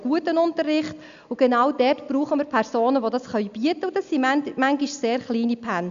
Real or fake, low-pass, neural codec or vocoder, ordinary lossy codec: real; 7.2 kHz; none; Opus, 64 kbps